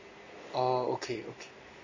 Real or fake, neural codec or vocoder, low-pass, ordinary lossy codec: real; none; 7.2 kHz; MP3, 32 kbps